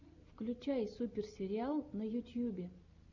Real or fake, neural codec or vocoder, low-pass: real; none; 7.2 kHz